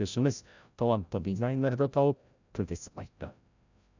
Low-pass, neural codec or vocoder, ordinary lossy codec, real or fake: 7.2 kHz; codec, 16 kHz, 0.5 kbps, FreqCodec, larger model; none; fake